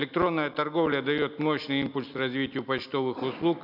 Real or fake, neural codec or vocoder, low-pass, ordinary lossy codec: real; none; 5.4 kHz; none